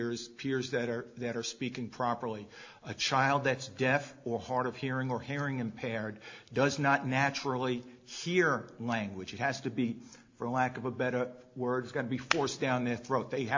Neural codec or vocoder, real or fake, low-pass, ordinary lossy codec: none; real; 7.2 kHz; AAC, 48 kbps